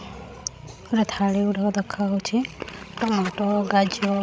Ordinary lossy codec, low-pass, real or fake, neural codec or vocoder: none; none; fake; codec, 16 kHz, 16 kbps, FreqCodec, larger model